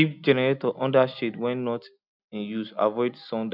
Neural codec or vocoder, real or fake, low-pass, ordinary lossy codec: none; real; 5.4 kHz; none